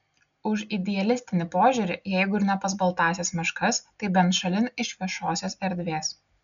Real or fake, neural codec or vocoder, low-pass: real; none; 7.2 kHz